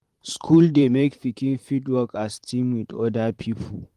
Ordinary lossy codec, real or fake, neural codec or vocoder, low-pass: Opus, 24 kbps; fake; vocoder, 44.1 kHz, 128 mel bands, Pupu-Vocoder; 14.4 kHz